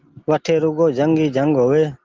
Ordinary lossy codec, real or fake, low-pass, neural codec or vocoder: Opus, 16 kbps; real; 7.2 kHz; none